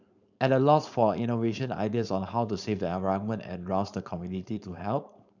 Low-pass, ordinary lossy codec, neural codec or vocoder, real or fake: 7.2 kHz; none; codec, 16 kHz, 4.8 kbps, FACodec; fake